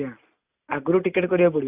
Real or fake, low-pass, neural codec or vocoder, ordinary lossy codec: real; 3.6 kHz; none; Opus, 64 kbps